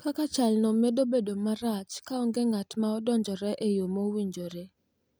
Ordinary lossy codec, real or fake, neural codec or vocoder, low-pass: none; real; none; none